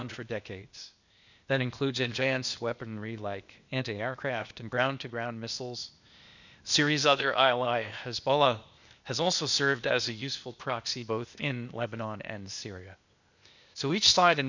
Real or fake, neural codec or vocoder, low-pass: fake; codec, 16 kHz, 0.8 kbps, ZipCodec; 7.2 kHz